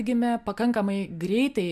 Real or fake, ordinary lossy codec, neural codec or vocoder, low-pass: real; MP3, 96 kbps; none; 14.4 kHz